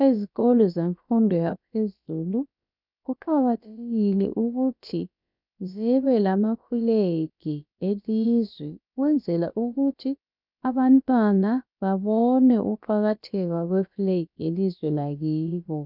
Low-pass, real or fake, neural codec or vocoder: 5.4 kHz; fake; codec, 16 kHz, about 1 kbps, DyCAST, with the encoder's durations